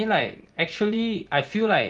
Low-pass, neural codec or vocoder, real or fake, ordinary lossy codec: 9.9 kHz; vocoder, 24 kHz, 100 mel bands, Vocos; fake; Opus, 32 kbps